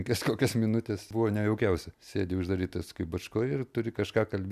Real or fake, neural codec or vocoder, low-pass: real; none; 14.4 kHz